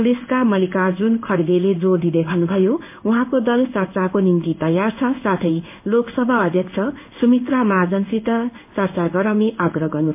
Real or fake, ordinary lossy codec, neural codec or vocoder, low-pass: fake; MP3, 32 kbps; codec, 16 kHz in and 24 kHz out, 1 kbps, XY-Tokenizer; 3.6 kHz